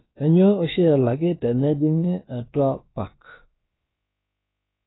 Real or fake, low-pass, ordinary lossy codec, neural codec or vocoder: fake; 7.2 kHz; AAC, 16 kbps; codec, 16 kHz, about 1 kbps, DyCAST, with the encoder's durations